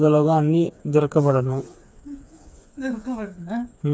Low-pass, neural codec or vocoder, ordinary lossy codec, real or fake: none; codec, 16 kHz, 4 kbps, FreqCodec, smaller model; none; fake